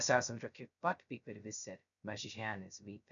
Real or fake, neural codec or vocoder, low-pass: fake; codec, 16 kHz, 0.2 kbps, FocalCodec; 7.2 kHz